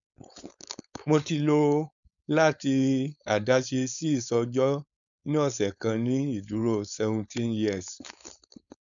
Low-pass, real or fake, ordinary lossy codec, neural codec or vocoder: 7.2 kHz; fake; none; codec, 16 kHz, 4.8 kbps, FACodec